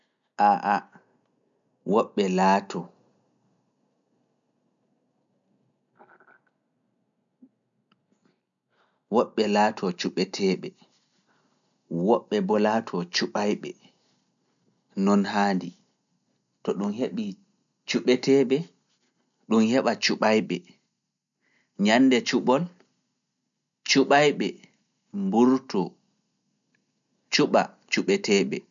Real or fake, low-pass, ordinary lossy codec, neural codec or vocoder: real; 7.2 kHz; none; none